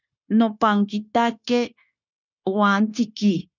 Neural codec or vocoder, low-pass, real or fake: codec, 16 kHz, 0.9 kbps, LongCat-Audio-Codec; 7.2 kHz; fake